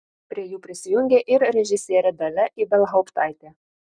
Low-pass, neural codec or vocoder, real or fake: 9.9 kHz; none; real